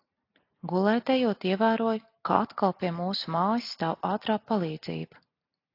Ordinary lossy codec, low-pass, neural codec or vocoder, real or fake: AAC, 32 kbps; 5.4 kHz; none; real